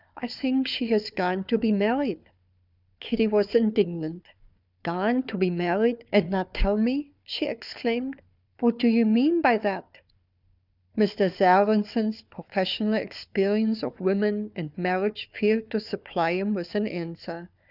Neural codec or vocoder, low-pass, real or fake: codec, 16 kHz, 4 kbps, FunCodec, trained on Chinese and English, 50 frames a second; 5.4 kHz; fake